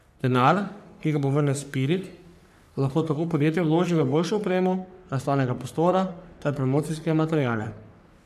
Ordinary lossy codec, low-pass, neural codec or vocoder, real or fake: none; 14.4 kHz; codec, 44.1 kHz, 3.4 kbps, Pupu-Codec; fake